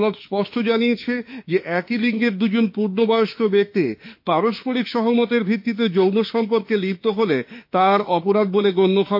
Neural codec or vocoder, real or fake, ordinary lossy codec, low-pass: autoencoder, 48 kHz, 32 numbers a frame, DAC-VAE, trained on Japanese speech; fake; MP3, 32 kbps; 5.4 kHz